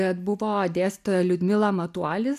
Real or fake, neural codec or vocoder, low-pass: real; none; 14.4 kHz